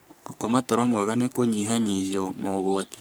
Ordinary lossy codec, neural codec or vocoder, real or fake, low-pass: none; codec, 44.1 kHz, 3.4 kbps, Pupu-Codec; fake; none